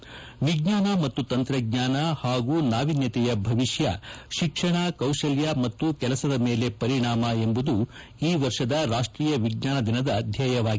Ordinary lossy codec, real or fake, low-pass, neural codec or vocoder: none; real; none; none